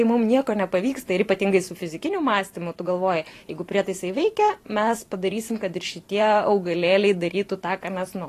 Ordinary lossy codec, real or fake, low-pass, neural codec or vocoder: AAC, 48 kbps; fake; 14.4 kHz; vocoder, 44.1 kHz, 128 mel bands every 512 samples, BigVGAN v2